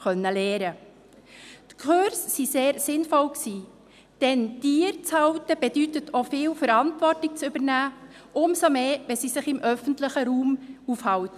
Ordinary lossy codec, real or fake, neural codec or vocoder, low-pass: none; real; none; 14.4 kHz